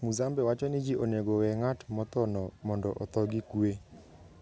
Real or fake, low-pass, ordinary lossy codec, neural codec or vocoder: real; none; none; none